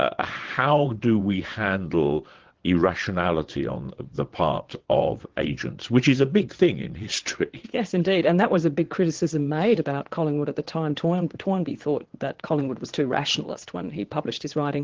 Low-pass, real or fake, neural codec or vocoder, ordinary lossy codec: 7.2 kHz; fake; vocoder, 22.05 kHz, 80 mel bands, WaveNeXt; Opus, 16 kbps